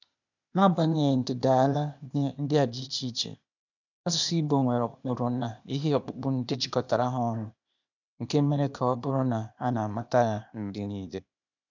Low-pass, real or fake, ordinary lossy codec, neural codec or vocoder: 7.2 kHz; fake; none; codec, 16 kHz, 0.8 kbps, ZipCodec